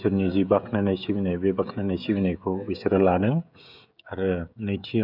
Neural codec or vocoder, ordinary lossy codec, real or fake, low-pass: codec, 16 kHz, 16 kbps, FreqCodec, smaller model; none; fake; 5.4 kHz